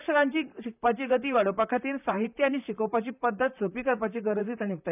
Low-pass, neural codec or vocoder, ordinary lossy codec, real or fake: 3.6 kHz; vocoder, 44.1 kHz, 128 mel bands, Pupu-Vocoder; none; fake